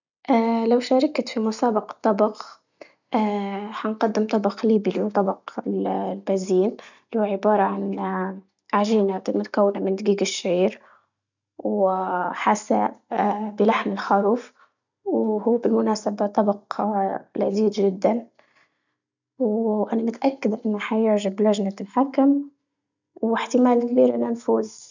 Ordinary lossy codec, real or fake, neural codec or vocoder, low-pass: none; real; none; 7.2 kHz